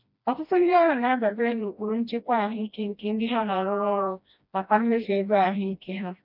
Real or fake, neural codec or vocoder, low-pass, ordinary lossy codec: fake; codec, 16 kHz, 1 kbps, FreqCodec, smaller model; 5.4 kHz; none